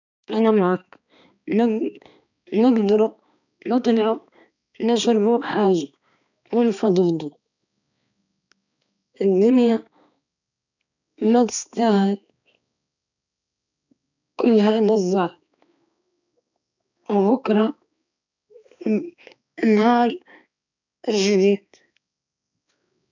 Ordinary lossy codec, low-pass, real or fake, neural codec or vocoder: none; 7.2 kHz; fake; codec, 16 kHz, 2 kbps, X-Codec, HuBERT features, trained on balanced general audio